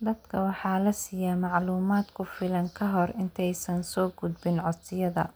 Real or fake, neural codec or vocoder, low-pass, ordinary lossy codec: real; none; none; none